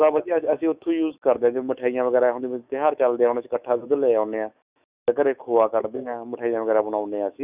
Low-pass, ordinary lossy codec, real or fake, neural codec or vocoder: 3.6 kHz; Opus, 64 kbps; fake; autoencoder, 48 kHz, 128 numbers a frame, DAC-VAE, trained on Japanese speech